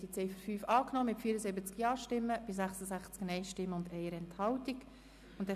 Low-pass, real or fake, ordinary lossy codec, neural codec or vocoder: 14.4 kHz; real; MP3, 96 kbps; none